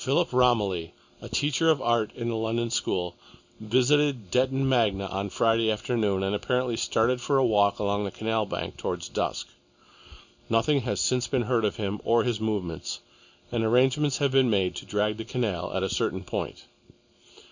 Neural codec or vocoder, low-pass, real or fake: none; 7.2 kHz; real